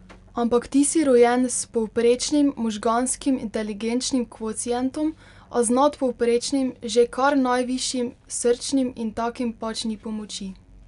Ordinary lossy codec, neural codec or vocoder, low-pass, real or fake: none; none; 10.8 kHz; real